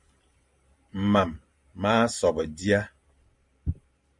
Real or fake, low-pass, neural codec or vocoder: fake; 10.8 kHz; vocoder, 44.1 kHz, 128 mel bands every 512 samples, BigVGAN v2